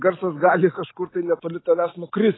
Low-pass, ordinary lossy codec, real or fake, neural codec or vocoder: 7.2 kHz; AAC, 16 kbps; real; none